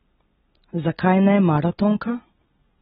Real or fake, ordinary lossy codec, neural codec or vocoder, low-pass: real; AAC, 16 kbps; none; 7.2 kHz